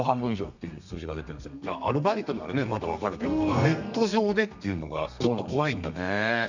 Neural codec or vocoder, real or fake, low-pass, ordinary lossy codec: codec, 32 kHz, 1.9 kbps, SNAC; fake; 7.2 kHz; none